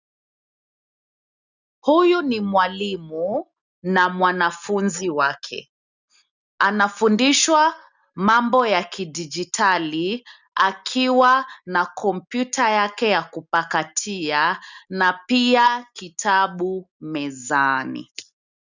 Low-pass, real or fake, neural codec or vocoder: 7.2 kHz; real; none